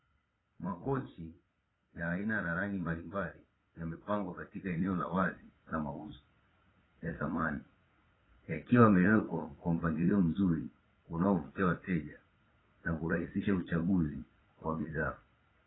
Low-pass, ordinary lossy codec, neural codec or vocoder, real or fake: 7.2 kHz; AAC, 16 kbps; vocoder, 22.05 kHz, 80 mel bands, Vocos; fake